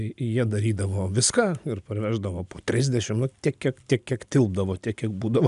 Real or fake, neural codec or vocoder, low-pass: real; none; 10.8 kHz